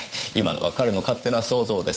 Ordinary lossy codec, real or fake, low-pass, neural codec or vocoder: none; fake; none; codec, 16 kHz, 8 kbps, FunCodec, trained on Chinese and English, 25 frames a second